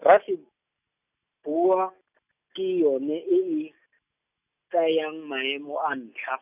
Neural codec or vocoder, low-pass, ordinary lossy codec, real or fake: none; 3.6 kHz; none; real